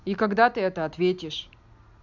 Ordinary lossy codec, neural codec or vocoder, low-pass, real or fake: none; none; 7.2 kHz; real